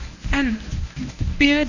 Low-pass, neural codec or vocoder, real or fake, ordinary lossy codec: 7.2 kHz; codec, 24 kHz, 0.9 kbps, WavTokenizer, medium speech release version 1; fake; none